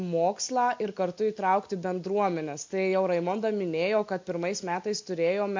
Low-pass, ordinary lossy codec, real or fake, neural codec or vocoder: 7.2 kHz; MP3, 48 kbps; real; none